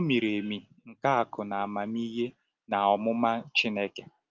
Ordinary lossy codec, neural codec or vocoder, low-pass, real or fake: Opus, 32 kbps; none; 7.2 kHz; real